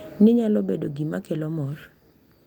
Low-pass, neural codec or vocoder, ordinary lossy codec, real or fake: 19.8 kHz; none; Opus, 32 kbps; real